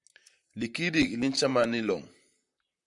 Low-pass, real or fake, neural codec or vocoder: 10.8 kHz; fake; vocoder, 44.1 kHz, 128 mel bands, Pupu-Vocoder